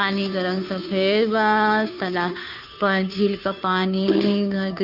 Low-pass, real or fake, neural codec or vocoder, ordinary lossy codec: 5.4 kHz; fake; codec, 16 kHz, 2 kbps, FunCodec, trained on Chinese and English, 25 frames a second; none